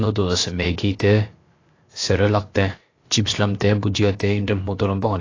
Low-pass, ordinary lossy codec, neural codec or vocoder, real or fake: 7.2 kHz; AAC, 32 kbps; codec, 16 kHz, about 1 kbps, DyCAST, with the encoder's durations; fake